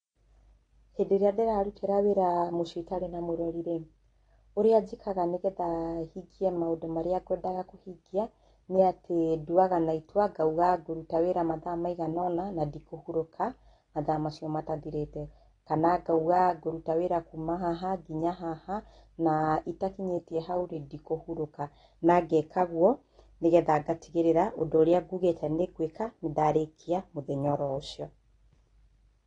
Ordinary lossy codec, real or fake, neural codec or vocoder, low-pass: AAC, 32 kbps; real; none; 9.9 kHz